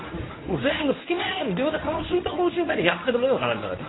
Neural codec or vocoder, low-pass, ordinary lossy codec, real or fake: codec, 24 kHz, 0.9 kbps, WavTokenizer, medium speech release version 1; 7.2 kHz; AAC, 16 kbps; fake